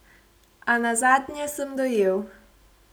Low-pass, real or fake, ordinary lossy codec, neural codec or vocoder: none; real; none; none